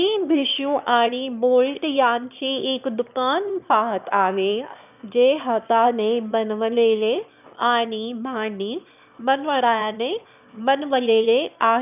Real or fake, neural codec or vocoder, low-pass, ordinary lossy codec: fake; autoencoder, 22.05 kHz, a latent of 192 numbers a frame, VITS, trained on one speaker; 3.6 kHz; none